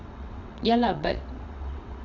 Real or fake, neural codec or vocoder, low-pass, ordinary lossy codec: fake; vocoder, 22.05 kHz, 80 mel bands, WaveNeXt; 7.2 kHz; none